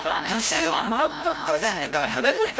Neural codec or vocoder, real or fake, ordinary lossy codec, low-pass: codec, 16 kHz, 0.5 kbps, FreqCodec, larger model; fake; none; none